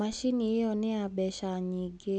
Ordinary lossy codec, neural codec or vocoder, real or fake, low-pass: none; none; real; 9.9 kHz